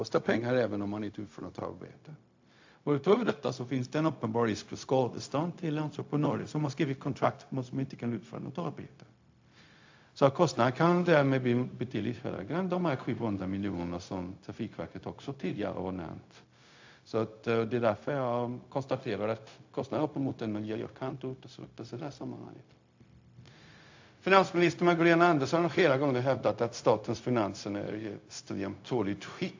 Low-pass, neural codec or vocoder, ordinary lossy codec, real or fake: 7.2 kHz; codec, 16 kHz, 0.4 kbps, LongCat-Audio-Codec; AAC, 48 kbps; fake